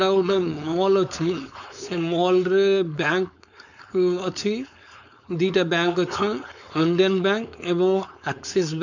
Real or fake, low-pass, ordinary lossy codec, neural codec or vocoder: fake; 7.2 kHz; none; codec, 16 kHz, 4.8 kbps, FACodec